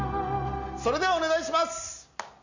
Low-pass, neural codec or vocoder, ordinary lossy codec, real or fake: 7.2 kHz; none; none; real